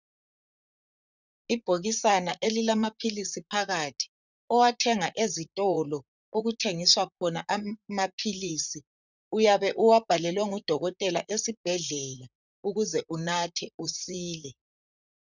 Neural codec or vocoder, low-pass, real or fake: vocoder, 44.1 kHz, 128 mel bands, Pupu-Vocoder; 7.2 kHz; fake